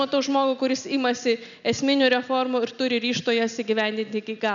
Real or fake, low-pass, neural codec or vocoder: real; 7.2 kHz; none